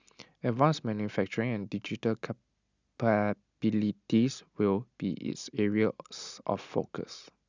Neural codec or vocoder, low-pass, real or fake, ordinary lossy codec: none; 7.2 kHz; real; none